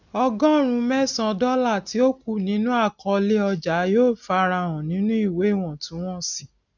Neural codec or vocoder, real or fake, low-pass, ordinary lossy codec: none; real; 7.2 kHz; none